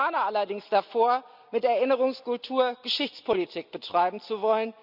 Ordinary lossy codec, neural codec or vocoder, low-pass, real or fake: Opus, 64 kbps; none; 5.4 kHz; real